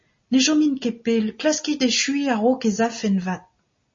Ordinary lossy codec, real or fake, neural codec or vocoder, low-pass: MP3, 32 kbps; real; none; 7.2 kHz